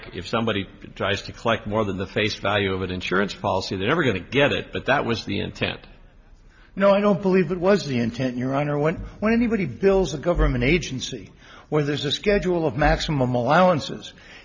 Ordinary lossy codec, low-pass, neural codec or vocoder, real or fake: MP3, 64 kbps; 7.2 kHz; none; real